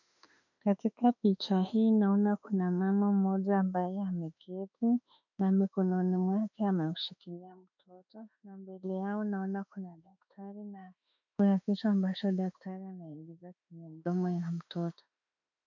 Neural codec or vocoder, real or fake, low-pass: autoencoder, 48 kHz, 32 numbers a frame, DAC-VAE, trained on Japanese speech; fake; 7.2 kHz